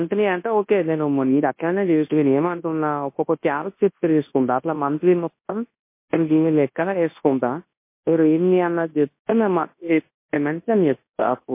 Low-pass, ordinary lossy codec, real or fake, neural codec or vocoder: 3.6 kHz; MP3, 24 kbps; fake; codec, 24 kHz, 0.9 kbps, WavTokenizer, large speech release